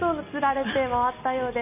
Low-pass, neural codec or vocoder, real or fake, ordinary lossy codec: 3.6 kHz; none; real; none